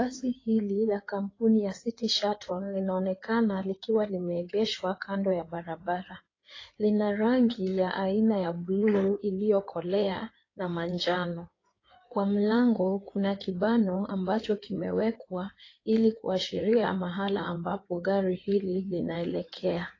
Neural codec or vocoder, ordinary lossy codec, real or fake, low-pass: codec, 16 kHz in and 24 kHz out, 2.2 kbps, FireRedTTS-2 codec; AAC, 32 kbps; fake; 7.2 kHz